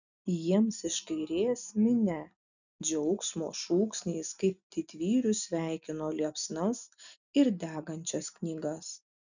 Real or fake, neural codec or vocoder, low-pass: real; none; 7.2 kHz